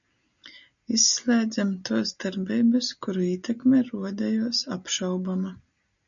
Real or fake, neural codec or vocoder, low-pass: real; none; 7.2 kHz